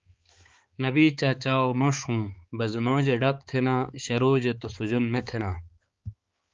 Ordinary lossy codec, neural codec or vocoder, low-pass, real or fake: Opus, 32 kbps; codec, 16 kHz, 4 kbps, X-Codec, HuBERT features, trained on balanced general audio; 7.2 kHz; fake